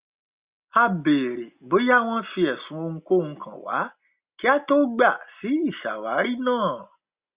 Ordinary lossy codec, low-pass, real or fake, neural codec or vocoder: Opus, 64 kbps; 3.6 kHz; real; none